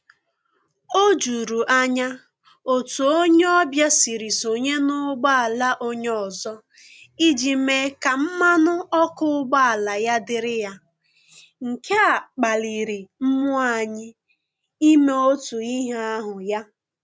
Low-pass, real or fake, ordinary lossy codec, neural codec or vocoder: none; real; none; none